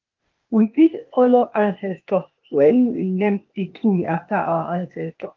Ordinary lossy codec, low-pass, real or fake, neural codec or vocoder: Opus, 24 kbps; 7.2 kHz; fake; codec, 16 kHz, 0.8 kbps, ZipCodec